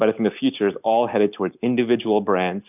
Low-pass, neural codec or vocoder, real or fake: 3.6 kHz; none; real